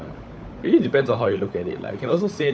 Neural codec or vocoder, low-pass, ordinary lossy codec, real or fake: codec, 16 kHz, 16 kbps, FunCodec, trained on LibriTTS, 50 frames a second; none; none; fake